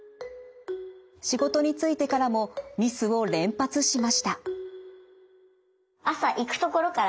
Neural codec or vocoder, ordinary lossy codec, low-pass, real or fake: none; none; none; real